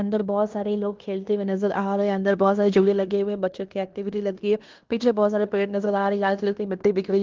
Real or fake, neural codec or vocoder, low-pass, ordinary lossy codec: fake; codec, 16 kHz in and 24 kHz out, 0.9 kbps, LongCat-Audio-Codec, fine tuned four codebook decoder; 7.2 kHz; Opus, 32 kbps